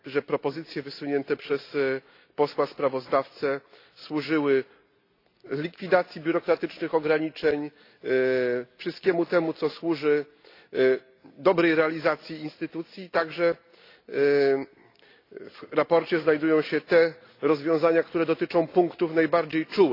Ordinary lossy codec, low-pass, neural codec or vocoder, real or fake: AAC, 32 kbps; 5.4 kHz; none; real